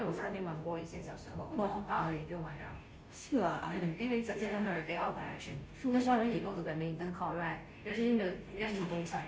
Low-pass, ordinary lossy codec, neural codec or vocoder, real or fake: none; none; codec, 16 kHz, 0.5 kbps, FunCodec, trained on Chinese and English, 25 frames a second; fake